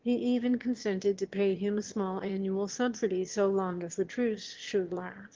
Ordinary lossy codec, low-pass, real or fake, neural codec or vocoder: Opus, 16 kbps; 7.2 kHz; fake; autoencoder, 22.05 kHz, a latent of 192 numbers a frame, VITS, trained on one speaker